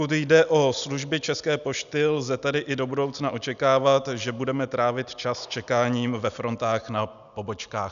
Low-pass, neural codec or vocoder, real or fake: 7.2 kHz; none; real